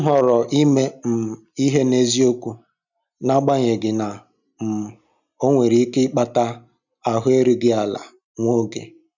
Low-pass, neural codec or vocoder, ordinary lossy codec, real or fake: 7.2 kHz; none; none; real